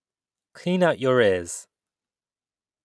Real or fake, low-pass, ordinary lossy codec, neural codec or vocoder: real; none; none; none